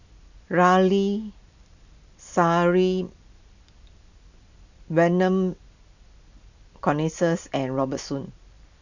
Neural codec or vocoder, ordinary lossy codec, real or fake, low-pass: none; none; real; 7.2 kHz